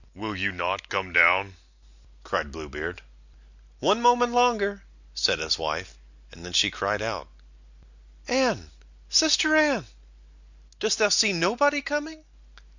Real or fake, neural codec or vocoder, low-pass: real; none; 7.2 kHz